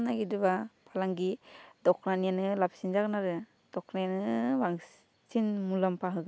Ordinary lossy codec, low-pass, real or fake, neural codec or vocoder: none; none; real; none